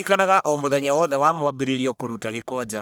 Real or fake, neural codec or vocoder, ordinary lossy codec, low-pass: fake; codec, 44.1 kHz, 1.7 kbps, Pupu-Codec; none; none